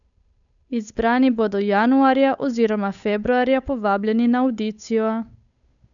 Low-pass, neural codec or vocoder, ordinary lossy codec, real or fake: 7.2 kHz; codec, 16 kHz, 8 kbps, FunCodec, trained on Chinese and English, 25 frames a second; none; fake